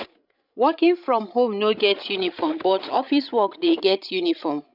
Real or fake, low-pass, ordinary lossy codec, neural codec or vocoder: fake; 5.4 kHz; none; codec, 16 kHz, 16 kbps, FreqCodec, larger model